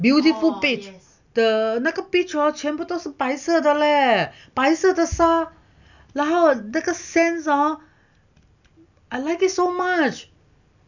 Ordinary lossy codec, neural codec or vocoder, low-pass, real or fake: none; none; 7.2 kHz; real